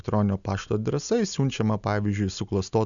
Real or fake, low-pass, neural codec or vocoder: real; 7.2 kHz; none